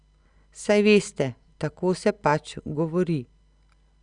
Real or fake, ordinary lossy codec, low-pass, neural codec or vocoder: real; Opus, 64 kbps; 9.9 kHz; none